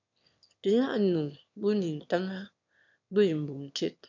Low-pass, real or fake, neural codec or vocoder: 7.2 kHz; fake; autoencoder, 22.05 kHz, a latent of 192 numbers a frame, VITS, trained on one speaker